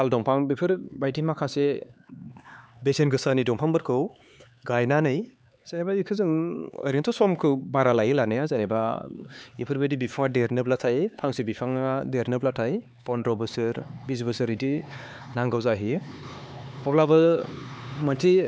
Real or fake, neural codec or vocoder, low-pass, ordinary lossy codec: fake; codec, 16 kHz, 4 kbps, X-Codec, HuBERT features, trained on LibriSpeech; none; none